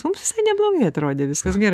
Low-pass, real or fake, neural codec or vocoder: 14.4 kHz; fake; autoencoder, 48 kHz, 128 numbers a frame, DAC-VAE, trained on Japanese speech